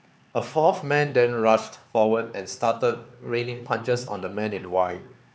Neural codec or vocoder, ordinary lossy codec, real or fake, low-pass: codec, 16 kHz, 4 kbps, X-Codec, HuBERT features, trained on LibriSpeech; none; fake; none